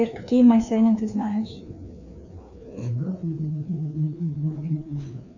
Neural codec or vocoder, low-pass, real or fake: codec, 16 kHz, 2 kbps, FreqCodec, larger model; 7.2 kHz; fake